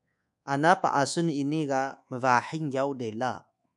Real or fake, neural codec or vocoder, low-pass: fake; codec, 24 kHz, 1.2 kbps, DualCodec; 10.8 kHz